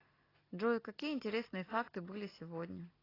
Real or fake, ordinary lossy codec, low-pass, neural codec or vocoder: real; AAC, 24 kbps; 5.4 kHz; none